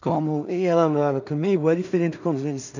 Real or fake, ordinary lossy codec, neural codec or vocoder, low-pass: fake; none; codec, 16 kHz in and 24 kHz out, 0.4 kbps, LongCat-Audio-Codec, two codebook decoder; 7.2 kHz